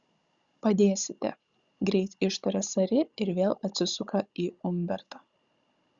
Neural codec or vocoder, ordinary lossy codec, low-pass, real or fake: codec, 16 kHz, 16 kbps, FunCodec, trained on Chinese and English, 50 frames a second; Opus, 64 kbps; 7.2 kHz; fake